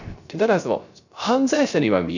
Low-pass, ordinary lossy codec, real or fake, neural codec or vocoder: 7.2 kHz; none; fake; codec, 16 kHz, 0.3 kbps, FocalCodec